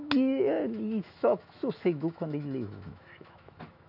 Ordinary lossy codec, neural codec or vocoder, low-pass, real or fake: none; autoencoder, 48 kHz, 128 numbers a frame, DAC-VAE, trained on Japanese speech; 5.4 kHz; fake